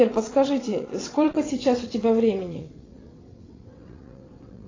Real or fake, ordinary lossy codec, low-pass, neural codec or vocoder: fake; AAC, 32 kbps; 7.2 kHz; vocoder, 22.05 kHz, 80 mel bands, WaveNeXt